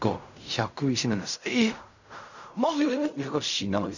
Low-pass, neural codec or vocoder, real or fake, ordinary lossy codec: 7.2 kHz; codec, 16 kHz in and 24 kHz out, 0.4 kbps, LongCat-Audio-Codec, fine tuned four codebook decoder; fake; none